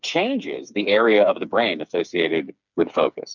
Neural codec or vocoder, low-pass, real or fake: codec, 16 kHz, 4 kbps, FreqCodec, smaller model; 7.2 kHz; fake